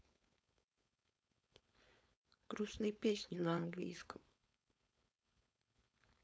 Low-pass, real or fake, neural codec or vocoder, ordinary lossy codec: none; fake; codec, 16 kHz, 4.8 kbps, FACodec; none